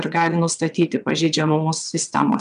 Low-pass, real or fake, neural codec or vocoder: 9.9 kHz; fake; vocoder, 22.05 kHz, 80 mel bands, WaveNeXt